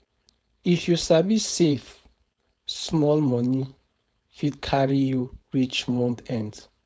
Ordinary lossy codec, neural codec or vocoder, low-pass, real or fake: none; codec, 16 kHz, 4.8 kbps, FACodec; none; fake